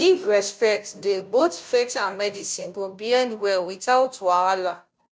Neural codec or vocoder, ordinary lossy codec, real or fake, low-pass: codec, 16 kHz, 0.5 kbps, FunCodec, trained on Chinese and English, 25 frames a second; none; fake; none